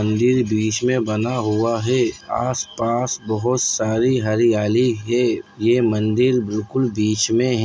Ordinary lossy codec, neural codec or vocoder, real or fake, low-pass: none; none; real; none